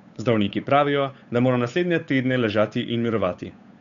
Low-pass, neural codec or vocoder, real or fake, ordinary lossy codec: 7.2 kHz; codec, 16 kHz, 8 kbps, FunCodec, trained on Chinese and English, 25 frames a second; fake; none